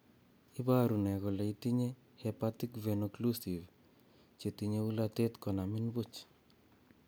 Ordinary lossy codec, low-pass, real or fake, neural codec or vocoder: none; none; real; none